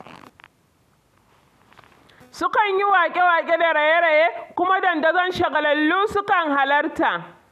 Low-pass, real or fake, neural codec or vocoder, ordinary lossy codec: 14.4 kHz; real; none; none